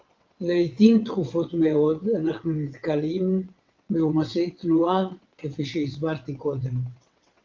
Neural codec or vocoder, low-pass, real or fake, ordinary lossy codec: vocoder, 22.05 kHz, 80 mel bands, WaveNeXt; 7.2 kHz; fake; Opus, 32 kbps